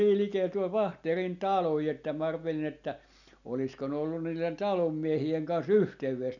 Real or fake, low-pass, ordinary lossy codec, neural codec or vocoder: real; 7.2 kHz; none; none